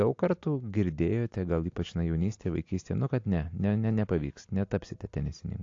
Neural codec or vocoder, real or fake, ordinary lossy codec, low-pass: none; real; AAC, 48 kbps; 7.2 kHz